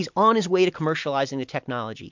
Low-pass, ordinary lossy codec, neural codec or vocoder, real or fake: 7.2 kHz; MP3, 64 kbps; none; real